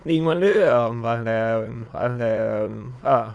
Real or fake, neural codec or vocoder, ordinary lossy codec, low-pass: fake; autoencoder, 22.05 kHz, a latent of 192 numbers a frame, VITS, trained on many speakers; none; none